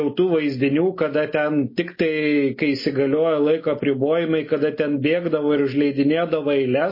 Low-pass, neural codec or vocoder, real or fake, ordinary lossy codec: 5.4 kHz; none; real; MP3, 24 kbps